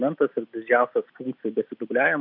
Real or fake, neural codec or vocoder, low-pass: real; none; 5.4 kHz